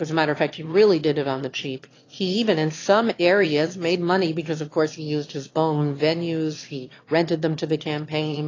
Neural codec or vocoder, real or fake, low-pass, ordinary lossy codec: autoencoder, 22.05 kHz, a latent of 192 numbers a frame, VITS, trained on one speaker; fake; 7.2 kHz; AAC, 32 kbps